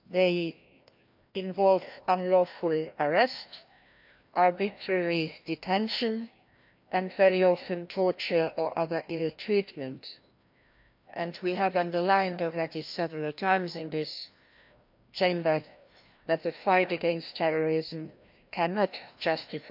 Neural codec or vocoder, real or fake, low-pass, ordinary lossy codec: codec, 16 kHz, 1 kbps, FreqCodec, larger model; fake; 5.4 kHz; MP3, 48 kbps